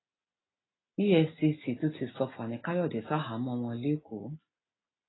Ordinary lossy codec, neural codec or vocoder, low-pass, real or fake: AAC, 16 kbps; none; 7.2 kHz; real